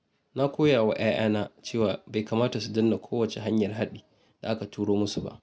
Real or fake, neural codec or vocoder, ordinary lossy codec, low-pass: real; none; none; none